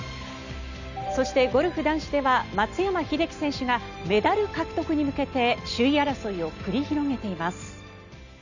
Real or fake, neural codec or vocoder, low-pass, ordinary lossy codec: real; none; 7.2 kHz; none